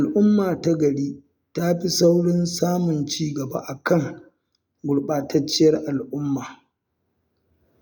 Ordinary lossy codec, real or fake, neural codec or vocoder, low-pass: none; real; none; none